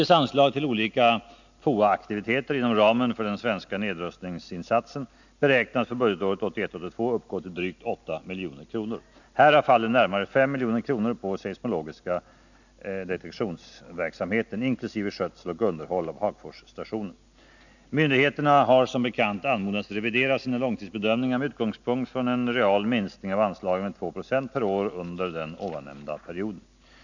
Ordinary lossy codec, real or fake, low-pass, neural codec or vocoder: none; real; 7.2 kHz; none